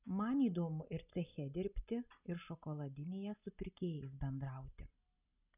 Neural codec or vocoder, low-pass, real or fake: none; 3.6 kHz; real